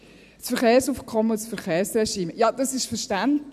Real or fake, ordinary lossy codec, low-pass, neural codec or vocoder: fake; none; 14.4 kHz; vocoder, 44.1 kHz, 128 mel bands every 256 samples, BigVGAN v2